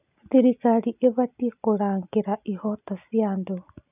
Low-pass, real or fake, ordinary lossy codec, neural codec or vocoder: 3.6 kHz; real; none; none